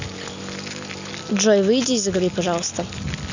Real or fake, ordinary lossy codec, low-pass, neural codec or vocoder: real; none; 7.2 kHz; none